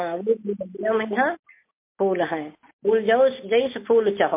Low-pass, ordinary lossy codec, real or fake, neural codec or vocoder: 3.6 kHz; MP3, 24 kbps; real; none